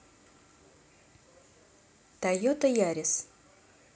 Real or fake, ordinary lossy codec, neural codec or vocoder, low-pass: real; none; none; none